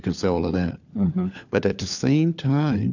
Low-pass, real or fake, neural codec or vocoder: 7.2 kHz; fake; codec, 16 kHz, 4 kbps, FunCodec, trained on LibriTTS, 50 frames a second